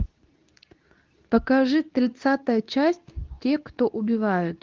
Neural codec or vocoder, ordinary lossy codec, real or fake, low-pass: codec, 24 kHz, 0.9 kbps, WavTokenizer, medium speech release version 2; Opus, 32 kbps; fake; 7.2 kHz